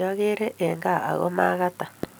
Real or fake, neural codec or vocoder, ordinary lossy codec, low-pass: fake; vocoder, 44.1 kHz, 128 mel bands every 256 samples, BigVGAN v2; none; none